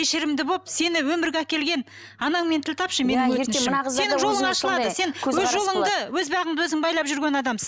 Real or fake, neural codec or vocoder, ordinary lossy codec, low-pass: real; none; none; none